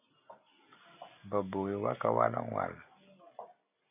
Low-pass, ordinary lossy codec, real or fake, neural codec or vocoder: 3.6 kHz; AAC, 24 kbps; real; none